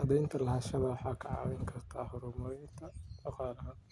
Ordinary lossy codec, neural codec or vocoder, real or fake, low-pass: none; none; real; none